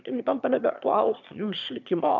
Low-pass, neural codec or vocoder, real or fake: 7.2 kHz; autoencoder, 22.05 kHz, a latent of 192 numbers a frame, VITS, trained on one speaker; fake